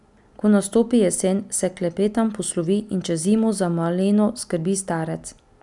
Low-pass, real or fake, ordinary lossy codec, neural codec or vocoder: 10.8 kHz; real; MP3, 96 kbps; none